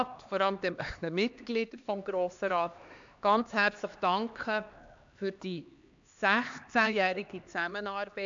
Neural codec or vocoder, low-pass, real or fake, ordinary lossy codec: codec, 16 kHz, 2 kbps, X-Codec, HuBERT features, trained on LibriSpeech; 7.2 kHz; fake; AAC, 64 kbps